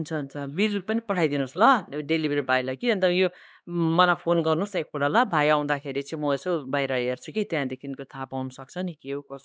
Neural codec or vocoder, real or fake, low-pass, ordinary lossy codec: codec, 16 kHz, 2 kbps, X-Codec, HuBERT features, trained on LibriSpeech; fake; none; none